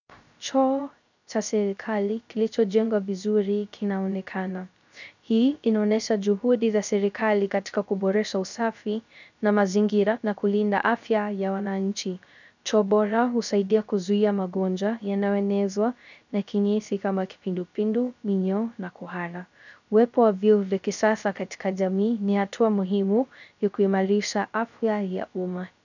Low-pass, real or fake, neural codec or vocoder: 7.2 kHz; fake; codec, 16 kHz, 0.3 kbps, FocalCodec